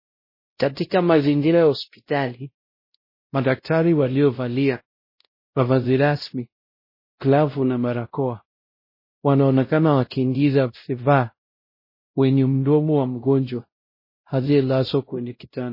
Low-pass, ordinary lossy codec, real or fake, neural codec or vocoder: 5.4 kHz; MP3, 24 kbps; fake; codec, 16 kHz, 0.5 kbps, X-Codec, WavLM features, trained on Multilingual LibriSpeech